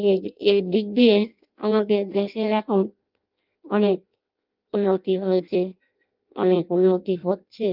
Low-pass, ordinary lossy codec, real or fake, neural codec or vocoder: 5.4 kHz; Opus, 24 kbps; fake; codec, 16 kHz in and 24 kHz out, 0.6 kbps, FireRedTTS-2 codec